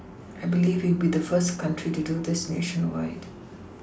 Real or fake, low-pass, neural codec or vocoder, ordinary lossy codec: real; none; none; none